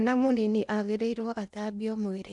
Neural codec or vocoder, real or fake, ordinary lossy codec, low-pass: codec, 16 kHz in and 24 kHz out, 0.8 kbps, FocalCodec, streaming, 65536 codes; fake; none; 10.8 kHz